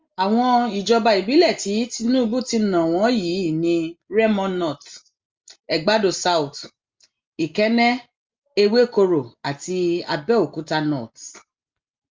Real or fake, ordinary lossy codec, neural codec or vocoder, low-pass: real; Opus, 32 kbps; none; 7.2 kHz